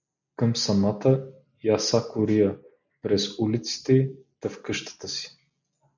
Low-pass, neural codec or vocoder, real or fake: 7.2 kHz; none; real